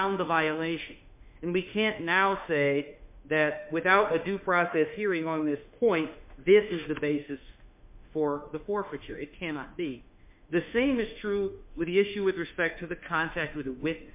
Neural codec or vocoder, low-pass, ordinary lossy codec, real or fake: autoencoder, 48 kHz, 32 numbers a frame, DAC-VAE, trained on Japanese speech; 3.6 kHz; MP3, 32 kbps; fake